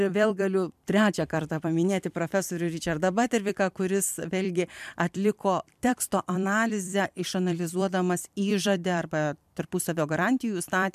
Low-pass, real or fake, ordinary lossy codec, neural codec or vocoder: 14.4 kHz; fake; MP3, 96 kbps; vocoder, 44.1 kHz, 128 mel bands every 256 samples, BigVGAN v2